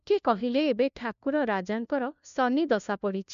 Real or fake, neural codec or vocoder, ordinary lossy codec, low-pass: fake; codec, 16 kHz, 1 kbps, FunCodec, trained on LibriTTS, 50 frames a second; MP3, 64 kbps; 7.2 kHz